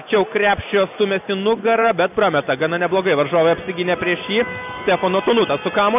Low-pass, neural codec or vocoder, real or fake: 3.6 kHz; none; real